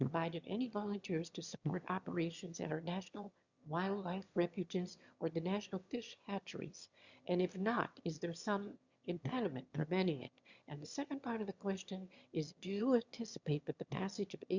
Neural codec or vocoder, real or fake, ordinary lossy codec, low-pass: autoencoder, 22.05 kHz, a latent of 192 numbers a frame, VITS, trained on one speaker; fake; Opus, 64 kbps; 7.2 kHz